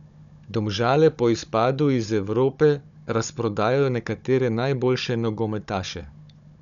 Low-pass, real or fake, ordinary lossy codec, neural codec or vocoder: 7.2 kHz; fake; none; codec, 16 kHz, 16 kbps, FunCodec, trained on Chinese and English, 50 frames a second